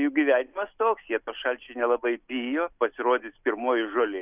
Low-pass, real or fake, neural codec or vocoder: 3.6 kHz; real; none